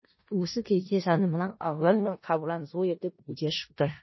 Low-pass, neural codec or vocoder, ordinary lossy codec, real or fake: 7.2 kHz; codec, 16 kHz in and 24 kHz out, 0.4 kbps, LongCat-Audio-Codec, four codebook decoder; MP3, 24 kbps; fake